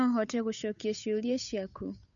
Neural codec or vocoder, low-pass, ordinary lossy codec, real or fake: codec, 16 kHz, 8 kbps, FunCodec, trained on Chinese and English, 25 frames a second; 7.2 kHz; none; fake